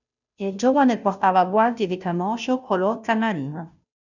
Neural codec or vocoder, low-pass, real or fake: codec, 16 kHz, 0.5 kbps, FunCodec, trained on Chinese and English, 25 frames a second; 7.2 kHz; fake